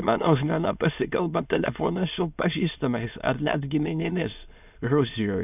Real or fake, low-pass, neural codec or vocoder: fake; 3.6 kHz; autoencoder, 22.05 kHz, a latent of 192 numbers a frame, VITS, trained on many speakers